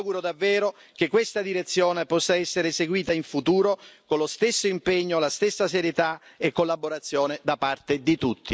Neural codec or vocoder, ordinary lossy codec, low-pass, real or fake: none; none; none; real